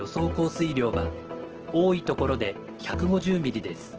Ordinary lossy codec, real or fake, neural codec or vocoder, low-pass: Opus, 16 kbps; real; none; 7.2 kHz